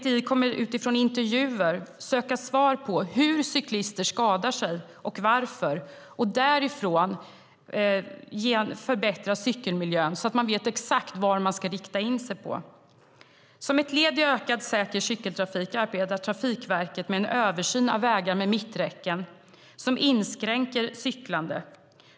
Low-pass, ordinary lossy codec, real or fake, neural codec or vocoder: none; none; real; none